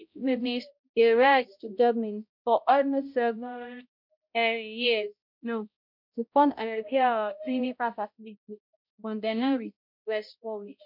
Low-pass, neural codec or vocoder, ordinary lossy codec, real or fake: 5.4 kHz; codec, 16 kHz, 0.5 kbps, X-Codec, HuBERT features, trained on balanced general audio; MP3, 48 kbps; fake